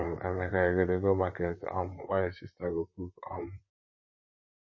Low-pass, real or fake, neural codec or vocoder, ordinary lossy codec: 7.2 kHz; fake; vocoder, 44.1 kHz, 128 mel bands, Pupu-Vocoder; MP3, 32 kbps